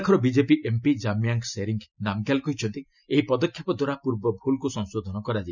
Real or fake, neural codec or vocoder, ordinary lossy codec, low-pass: real; none; none; 7.2 kHz